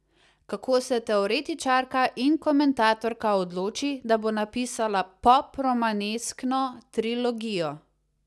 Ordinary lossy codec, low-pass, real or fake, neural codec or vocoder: none; none; real; none